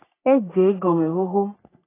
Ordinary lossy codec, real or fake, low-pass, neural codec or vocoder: AAC, 16 kbps; fake; 3.6 kHz; codec, 44.1 kHz, 3.4 kbps, Pupu-Codec